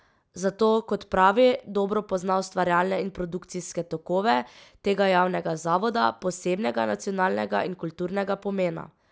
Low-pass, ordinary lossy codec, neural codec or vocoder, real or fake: none; none; none; real